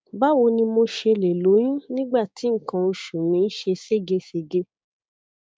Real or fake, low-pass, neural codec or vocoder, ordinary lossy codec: fake; none; codec, 16 kHz, 6 kbps, DAC; none